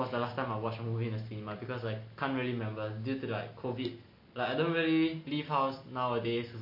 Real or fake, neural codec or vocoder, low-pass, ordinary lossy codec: real; none; 5.4 kHz; MP3, 32 kbps